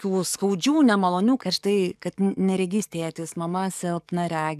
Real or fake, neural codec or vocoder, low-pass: fake; codec, 44.1 kHz, 7.8 kbps, DAC; 14.4 kHz